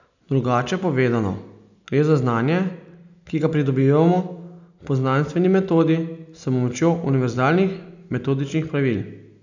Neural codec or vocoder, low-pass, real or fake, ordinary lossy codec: none; 7.2 kHz; real; none